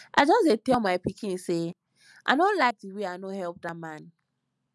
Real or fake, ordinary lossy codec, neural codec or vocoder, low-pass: real; none; none; none